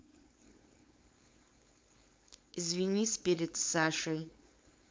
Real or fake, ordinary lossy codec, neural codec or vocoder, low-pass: fake; none; codec, 16 kHz, 4.8 kbps, FACodec; none